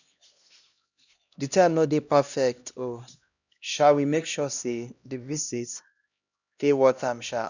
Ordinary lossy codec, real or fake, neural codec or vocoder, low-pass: none; fake; codec, 16 kHz, 1 kbps, X-Codec, HuBERT features, trained on LibriSpeech; 7.2 kHz